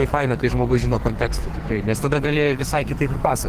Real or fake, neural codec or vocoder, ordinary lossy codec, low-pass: fake; codec, 44.1 kHz, 2.6 kbps, SNAC; Opus, 16 kbps; 14.4 kHz